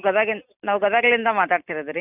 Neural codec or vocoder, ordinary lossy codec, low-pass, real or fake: none; none; 3.6 kHz; real